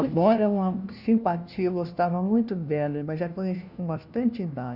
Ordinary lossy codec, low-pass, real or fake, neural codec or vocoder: none; 5.4 kHz; fake; codec, 16 kHz, 1 kbps, FunCodec, trained on LibriTTS, 50 frames a second